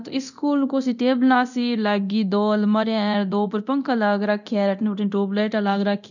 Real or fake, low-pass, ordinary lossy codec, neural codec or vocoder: fake; 7.2 kHz; none; codec, 24 kHz, 0.9 kbps, DualCodec